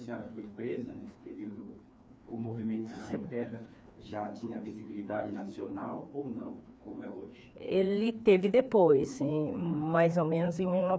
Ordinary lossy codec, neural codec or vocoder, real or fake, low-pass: none; codec, 16 kHz, 2 kbps, FreqCodec, larger model; fake; none